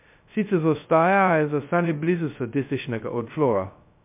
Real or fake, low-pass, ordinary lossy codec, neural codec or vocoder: fake; 3.6 kHz; MP3, 32 kbps; codec, 16 kHz, 0.2 kbps, FocalCodec